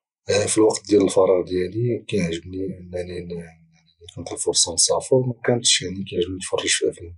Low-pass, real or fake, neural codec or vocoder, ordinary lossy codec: 10.8 kHz; real; none; none